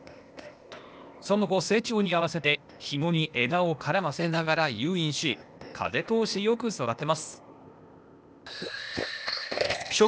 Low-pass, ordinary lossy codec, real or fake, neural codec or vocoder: none; none; fake; codec, 16 kHz, 0.8 kbps, ZipCodec